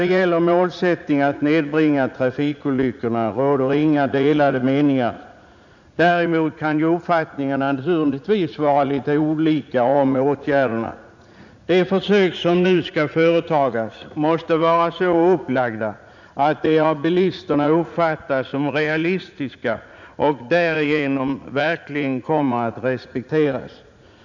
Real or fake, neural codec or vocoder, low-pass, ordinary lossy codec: fake; vocoder, 44.1 kHz, 80 mel bands, Vocos; 7.2 kHz; none